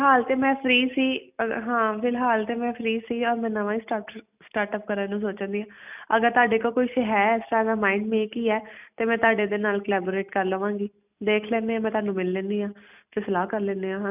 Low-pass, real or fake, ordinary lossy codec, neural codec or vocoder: 3.6 kHz; real; none; none